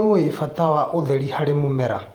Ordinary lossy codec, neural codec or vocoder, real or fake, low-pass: Opus, 32 kbps; vocoder, 48 kHz, 128 mel bands, Vocos; fake; 19.8 kHz